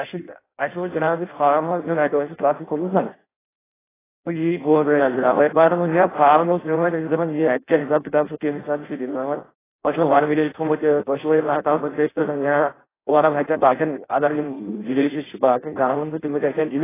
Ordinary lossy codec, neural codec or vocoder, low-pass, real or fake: AAC, 16 kbps; codec, 16 kHz in and 24 kHz out, 0.6 kbps, FireRedTTS-2 codec; 3.6 kHz; fake